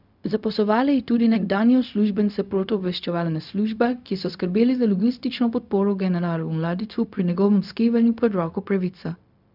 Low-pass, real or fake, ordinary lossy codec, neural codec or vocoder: 5.4 kHz; fake; none; codec, 16 kHz, 0.4 kbps, LongCat-Audio-Codec